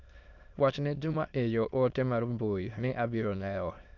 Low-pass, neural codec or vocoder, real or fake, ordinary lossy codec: 7.2 kHz; autoencoder, 22.05 kHz, a latent of 192 numbers a frame, VITS, trained on many speakers; fake; MP3, 64 kbps